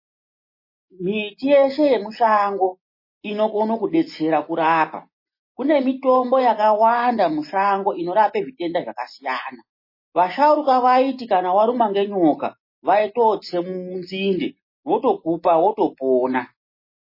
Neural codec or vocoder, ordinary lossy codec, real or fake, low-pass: none; MP3, 24 kbps; real; 5.4 kHz